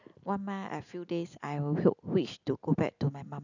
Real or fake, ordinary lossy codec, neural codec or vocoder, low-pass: real; none; none; 7.2 kHz